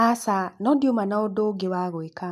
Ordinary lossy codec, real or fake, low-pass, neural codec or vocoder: MP3, 96 kbps; real; 14.4 kHz; none